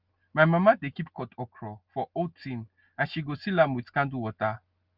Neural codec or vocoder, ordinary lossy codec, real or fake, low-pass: none; Opus, 24 kbps; real; 5.4 kHz